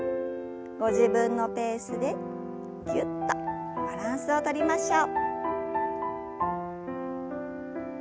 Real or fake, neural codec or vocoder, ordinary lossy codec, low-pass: real; none; none; none